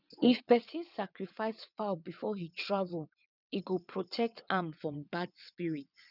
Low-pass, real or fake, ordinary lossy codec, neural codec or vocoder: 5.4 kHz; fake; none; vocoder, 22.05 kHz, 80 mel bands, WaveNeXt